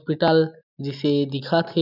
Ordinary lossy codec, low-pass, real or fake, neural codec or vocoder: none; 5.4 kHz; real; none